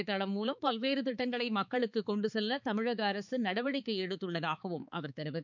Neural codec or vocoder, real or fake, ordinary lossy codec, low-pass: codec, 16 kHz, 4 kbps, X-Codec, HuBERT features, trained on balanced general audio; fake; none; 7.2 kHz